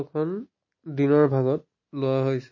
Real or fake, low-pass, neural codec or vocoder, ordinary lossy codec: real; 7.2 kHz; none; MP3, 32 kbps